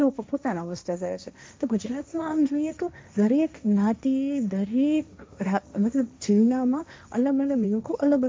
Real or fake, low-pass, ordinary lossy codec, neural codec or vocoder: fake; none; none; codec, 16 kHz, 1.1 kbps, Voila-Tokenizer